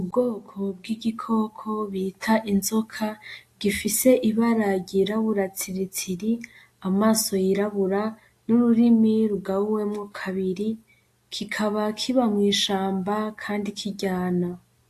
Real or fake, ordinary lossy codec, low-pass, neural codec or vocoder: real; AAC, 64 kbps; 14.4 kHz; none